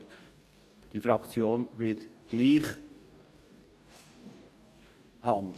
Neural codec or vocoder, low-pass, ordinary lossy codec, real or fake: codec, 44.1 kHz, 2.6 kbps, DAC; 14.4 kHz; none; fake